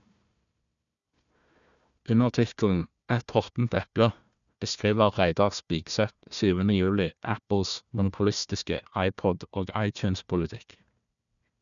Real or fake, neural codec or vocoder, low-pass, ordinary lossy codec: fake; codec, 16 kHz, 1 kbps, FunCodec, trained on Chinese and English, 50 frames a second; 7.2 kHz; none